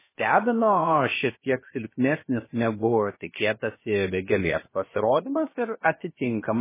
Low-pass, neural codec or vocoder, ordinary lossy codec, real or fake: 3.6 kHz; codec, 16 kHz, about 1 kbps, DyCAST, with the encoder's durations; MP3, 16 kbps; fake